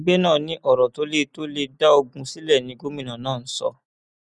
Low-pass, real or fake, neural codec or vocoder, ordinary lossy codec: 10.8 kHz; real; none; none